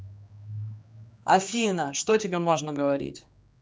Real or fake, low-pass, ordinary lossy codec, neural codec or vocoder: fake; none; none; codec, 16 kHz, 2 kbps, X-Codec, HuBERT features, trained on general audio